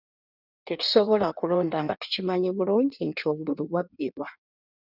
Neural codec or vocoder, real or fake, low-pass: codec, 16 kHz in and 24 kHz out, 1.1 kbps, FireRedTTS-2 codec; fake; 5.4 kHz